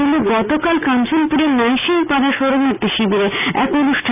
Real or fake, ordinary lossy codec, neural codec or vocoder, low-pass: real; none; none; 3.6 kHz